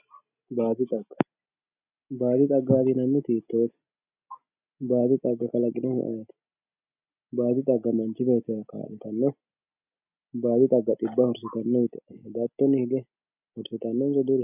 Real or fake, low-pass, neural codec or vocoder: real; 3.6 kHz; none